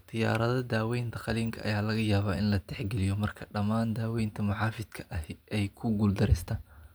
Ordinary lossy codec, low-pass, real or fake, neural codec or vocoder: none; none; real; none